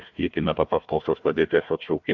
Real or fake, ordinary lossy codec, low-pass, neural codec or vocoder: fake; MP3, 64 kbps; 7.2 kHz; codec, 16 kHz, 1 kbps, FunCodec, trained on Chinese and English, 50 frames a second